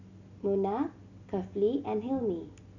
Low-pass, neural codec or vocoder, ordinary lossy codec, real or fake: 7.2 kHz; none; none; real